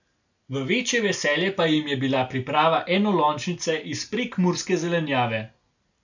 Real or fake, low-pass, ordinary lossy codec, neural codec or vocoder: real; 7.2 kHz; none; none